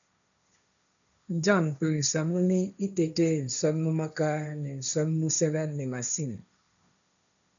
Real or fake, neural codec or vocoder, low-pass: fake; codec, 16 kHz, 1.1 kbps, Voila-Tokenizer; 7.2 kHz